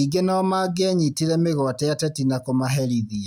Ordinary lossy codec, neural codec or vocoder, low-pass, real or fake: none; none; 19.8 kHz; real